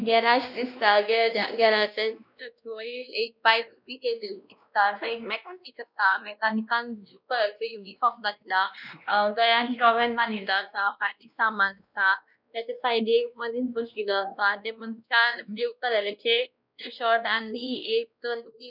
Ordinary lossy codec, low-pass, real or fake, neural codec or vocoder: none; 5.4 kHz; fake; codec, 16 kHz, 1 kbps, X-Codec, WavLM features, trained on Multilingual LibriSpeech